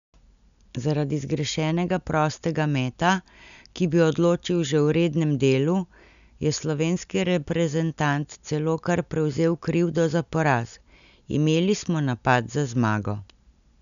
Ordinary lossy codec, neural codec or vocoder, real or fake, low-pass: none; none; real; 7.2 kHz